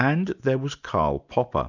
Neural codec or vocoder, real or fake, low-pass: none; real; 7.2 kHz